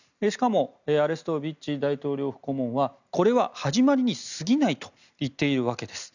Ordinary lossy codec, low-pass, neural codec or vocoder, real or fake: none; 7.2 kHz; none; real